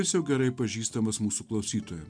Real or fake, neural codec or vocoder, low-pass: real; none; 9.9 kHz